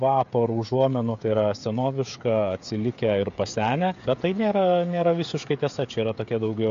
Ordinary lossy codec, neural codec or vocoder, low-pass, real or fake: AAC, 48 kbps; codec, 16 kHz, 16 kbps, FreqCodec, smaller model; 7.2 kHz; fake